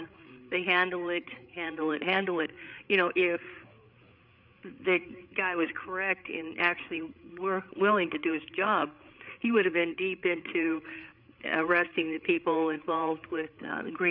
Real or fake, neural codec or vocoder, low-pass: fake; codec, 16 kHz, 8 kbps, FreqCodec, larger model; 5.4 kHz